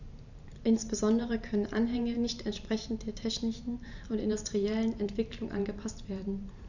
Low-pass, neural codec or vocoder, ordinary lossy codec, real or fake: 7.2 kHz; vocoder, 44.1 kHz, 128 mel bands every 512 samples, BigVGAN v2; none; fake